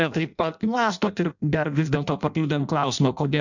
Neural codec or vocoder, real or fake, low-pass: codec, 16 kHz in and 24 kHz out, 0.6 kbps, FireRedTTS-2 codec; fake; 7.2 kHz